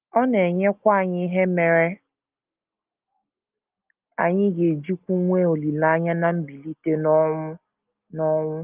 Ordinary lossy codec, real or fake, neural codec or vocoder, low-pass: Opus, 32 kbps; real; none; 3.6 kHz